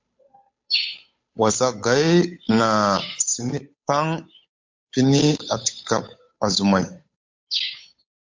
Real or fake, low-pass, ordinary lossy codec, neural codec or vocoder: fake; 7.2 kHz; MP3, 48 kbps; codec, 16 kHz, 8 kbps, FunCodec, trained on Chinese and English, 25 frames a second